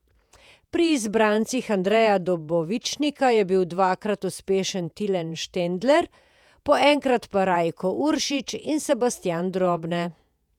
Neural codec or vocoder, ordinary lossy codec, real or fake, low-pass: vocoder, 48 kHz, 128 mel bands, Vocos; none; fake; 19.8 kHz